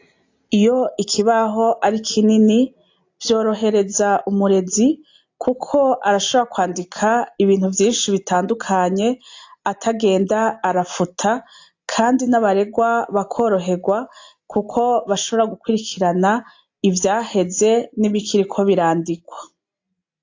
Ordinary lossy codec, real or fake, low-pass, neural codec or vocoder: AAC, 48 kbps; real; 7.2 kHz; none